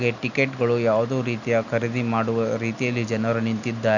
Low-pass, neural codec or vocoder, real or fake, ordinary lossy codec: 7.2 kHz; none; real; none